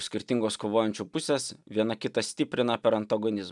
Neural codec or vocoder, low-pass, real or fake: none; 10.8 kHz; real